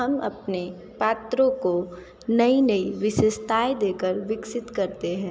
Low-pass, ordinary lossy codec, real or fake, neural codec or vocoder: none; none; real; none